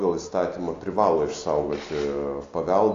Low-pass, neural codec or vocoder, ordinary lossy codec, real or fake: 7.2 kHz; none; AAC, 48 kbps; real